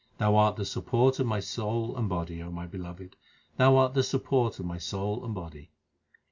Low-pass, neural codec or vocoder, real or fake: 7.2 kHz; none; real